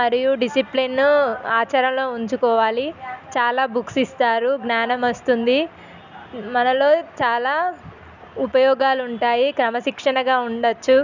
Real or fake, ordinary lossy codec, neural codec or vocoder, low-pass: real; none; none; 7.2 kHz